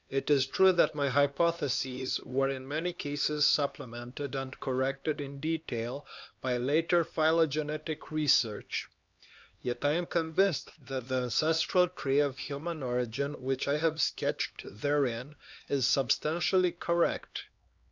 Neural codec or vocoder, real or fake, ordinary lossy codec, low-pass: codec, 16 kHz, 2 kbps, X-Codec, HuBERT features, trained on LibriSpeech; fake; Opus, 64 kbps; 7.2 kHz